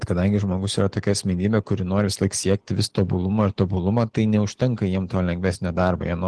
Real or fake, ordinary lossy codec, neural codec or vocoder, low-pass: real; Opus, 16 kbps; none; 10.8 kHz